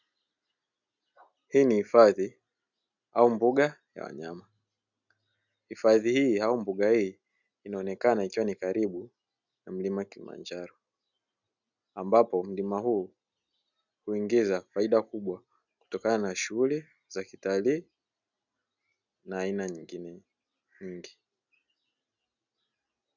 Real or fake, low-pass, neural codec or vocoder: real; 7.2 kHz; none